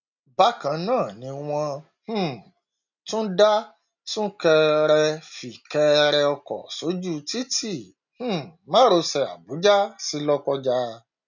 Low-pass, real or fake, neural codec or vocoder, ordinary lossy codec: 7.2 kHz; real; none; none